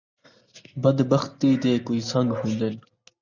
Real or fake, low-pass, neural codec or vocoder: real; 7.2 kHz; none